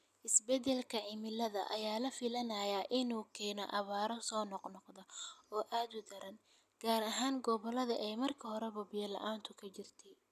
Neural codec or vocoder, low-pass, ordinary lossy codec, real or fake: none; 14.4 kHz; none; real